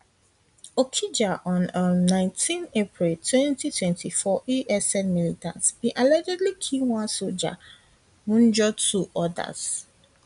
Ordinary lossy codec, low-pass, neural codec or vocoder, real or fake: none; 10.8 kHz; none; real